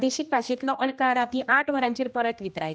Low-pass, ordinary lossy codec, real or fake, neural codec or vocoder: none; none; fake; codec, 16 kHz, 1 kbps, X-Codec, HuBERT features, trained on general audio